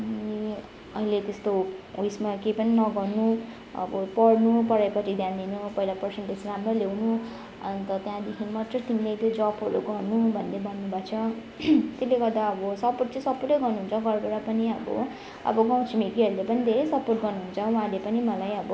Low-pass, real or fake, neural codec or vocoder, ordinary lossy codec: none; real; none; none